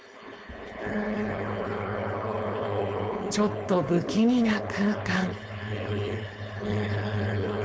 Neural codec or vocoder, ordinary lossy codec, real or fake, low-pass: codec, 16 kHz, 4.8 kbps, FACodec; none; fake; none